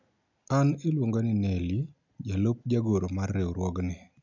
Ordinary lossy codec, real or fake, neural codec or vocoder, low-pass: none; real; none; 7.2 kHz